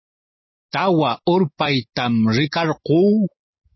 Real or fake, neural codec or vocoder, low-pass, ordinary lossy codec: real; none; 7.2 kHz; MP3, 24 kbps